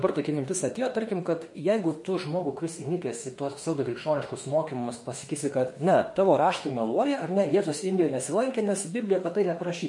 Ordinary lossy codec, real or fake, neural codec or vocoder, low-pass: MP3, 48 kbps; fake; autoencoder, 48 kHz, 32 numbers a frame, DAC-VAE, trained on Japanese speech; 10.8 kHz